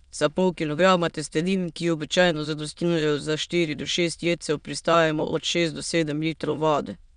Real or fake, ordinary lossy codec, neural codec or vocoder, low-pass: fake; none; autoencoder, 22.05 kHz, a latent of 192 numbers a frame, VITS, trained on many speakers; 9.9 kHz